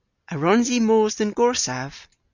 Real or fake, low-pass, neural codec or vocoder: real; 7.2 kHz; none